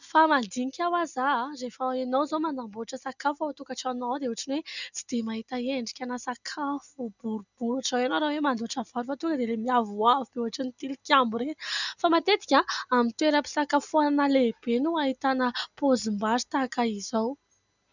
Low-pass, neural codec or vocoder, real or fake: 7.2 kHz; none; real